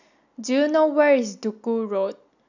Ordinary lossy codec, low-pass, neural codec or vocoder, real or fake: none; 7.2 kHz; none; real